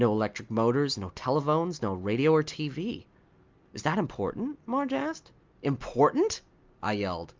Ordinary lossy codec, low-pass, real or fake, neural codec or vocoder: Opus, 32 kbps; 7.2 kHz; real; none